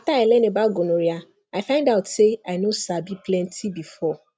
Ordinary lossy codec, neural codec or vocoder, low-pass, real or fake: none; none; none; real